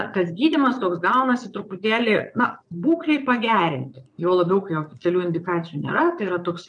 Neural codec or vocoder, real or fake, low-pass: vocoder, 22.05 kHz, 80 mel bands, Vocos; fake; 9.9 kHz